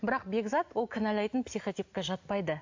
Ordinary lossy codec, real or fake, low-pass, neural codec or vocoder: AAC, 48 kbps; real; 7.2 kHz; none